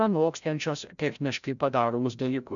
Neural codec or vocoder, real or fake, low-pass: codec, 16 kHz, 0.5 kbps, FreqCodec, larger model; fake; 7.2 kHz